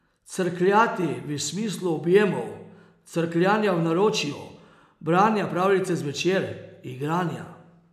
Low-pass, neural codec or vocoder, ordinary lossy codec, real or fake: 14.4 kHz; none; none; real